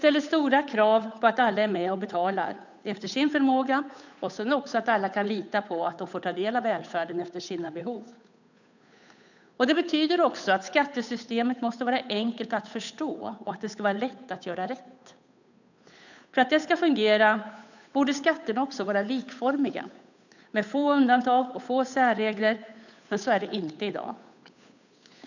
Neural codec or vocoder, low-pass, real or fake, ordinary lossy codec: codec, 16 kHz, 8 kbps, FunCodec, trained on Chinese and English, 25 frames a second; 7.2 kHz; fake; none